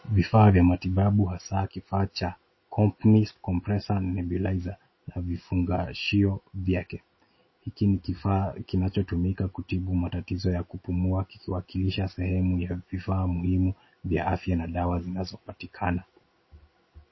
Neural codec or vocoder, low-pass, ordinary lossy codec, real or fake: none; 7.2 kHz; MP3, 24 kbps; real